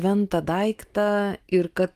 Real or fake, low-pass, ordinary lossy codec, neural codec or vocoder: fake; 14.4 kHz; Opus, 24 kbps; autoencoder, 48 kHz, 128 numbers a frame, DAC-VAE, trained on Japanese speech